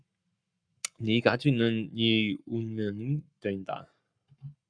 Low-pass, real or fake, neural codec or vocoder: 9.9 kHz; fake; codec, 44.1 kHz, 7.8 kbps, Pupu-Codec